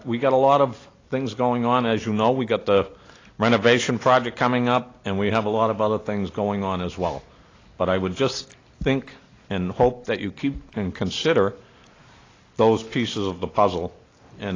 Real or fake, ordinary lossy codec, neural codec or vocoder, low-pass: real; AAC, 32 kbps; none; 7.2 kHz